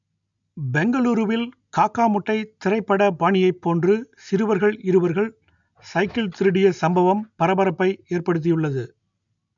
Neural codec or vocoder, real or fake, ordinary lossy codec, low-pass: none; real; none; 7.2 kHz